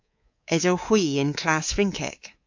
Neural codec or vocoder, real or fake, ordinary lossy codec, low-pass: codec, 24 kHz, 3.1 kbps, DualCodec; fake; AAC, 48 kbps; 7.2 kHz